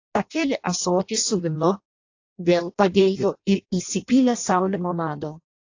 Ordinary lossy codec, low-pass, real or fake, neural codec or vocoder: AAC, 48 kbps; 7.2 kHz; fake; codec, 16 kHz in and 24 kHz out, 0.6 kbps, FireRedTTS-2 codec